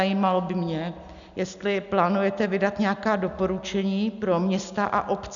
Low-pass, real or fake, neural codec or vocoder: 7.2 kHz; real; none